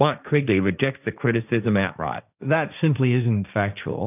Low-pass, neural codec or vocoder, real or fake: 3.6 kHz; codec, 16 kHz, 1.1 kbps, Voila-Tokenizer; fake